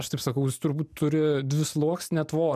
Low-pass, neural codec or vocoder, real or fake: 14.4 kHz; none; real